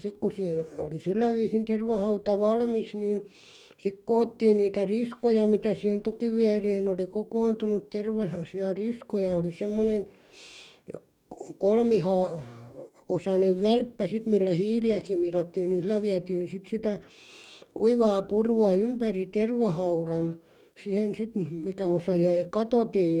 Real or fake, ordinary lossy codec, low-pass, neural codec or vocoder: fake; none; 19.8 kHz; codec, 44.1 kHz, 2.6 kbps, DAC